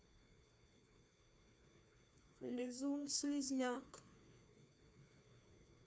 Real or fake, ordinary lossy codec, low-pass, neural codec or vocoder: fake; none; none; codec, 16 kHz, 2 kbps, FreqCodec, larger model